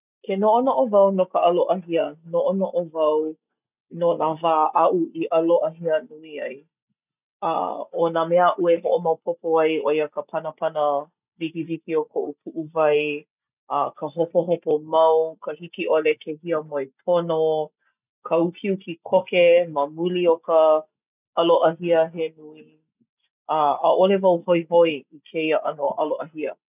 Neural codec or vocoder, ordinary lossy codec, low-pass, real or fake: none; none; 3.6 kHz; real